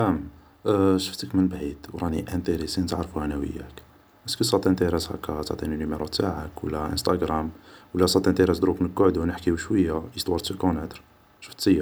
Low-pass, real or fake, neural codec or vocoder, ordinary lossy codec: none; real; none; none